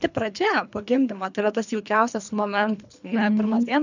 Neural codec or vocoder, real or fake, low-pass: codec, 24 kHz, 3 kbps, HILCodec; fake; 7.2 kHz